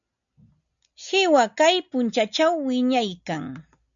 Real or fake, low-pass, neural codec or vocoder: real; 7.2 kHz; none